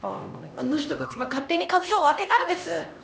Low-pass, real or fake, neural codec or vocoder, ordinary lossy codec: none; fake; codec, 16 kHz, 1 kbps, X-Codec, HuBERT features, trained on LibriSpeech; none